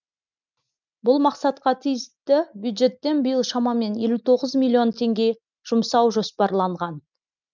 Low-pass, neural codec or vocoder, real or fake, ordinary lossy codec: 7.2 kHz; none; real; none